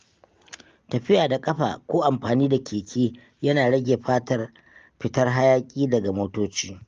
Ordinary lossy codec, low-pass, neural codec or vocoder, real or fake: Opus, 32 kbps; 7.2 kHz; none; real